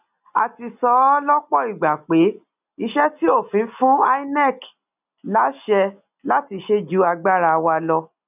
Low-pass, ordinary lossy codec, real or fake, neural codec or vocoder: 3.6 kHz; none; real; none